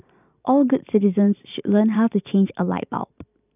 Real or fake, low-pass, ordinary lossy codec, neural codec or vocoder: fake; 3.6 kHz; none; vocoder, 44.1 kHz, 128 mel bands every 512 samples, BigVGAN v2